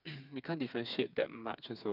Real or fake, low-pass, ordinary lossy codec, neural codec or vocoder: fake; 5.4 kHz; none; codec, 16 kHz, 4 kbps, FreqCodec, smaller model